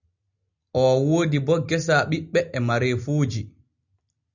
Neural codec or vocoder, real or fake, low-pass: none; real; 7.2 kHz